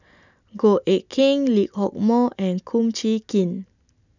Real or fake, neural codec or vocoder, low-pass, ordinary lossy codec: real; none; 7.2 kHz; none